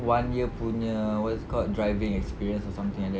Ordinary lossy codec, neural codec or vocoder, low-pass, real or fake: none; none; none; real